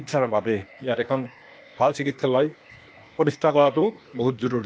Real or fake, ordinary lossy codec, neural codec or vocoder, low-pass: fake; none; codec, 16 kHz, 0.8 kbps, ZipCodec; none